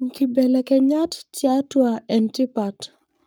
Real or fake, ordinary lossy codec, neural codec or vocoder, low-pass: fake; none; codec, 44.1 kHz, 7.8 kbps, Pupu-Codec; none